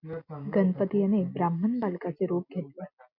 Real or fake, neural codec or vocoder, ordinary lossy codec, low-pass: real; none; MP3, 48 kbps; 5.4 kHz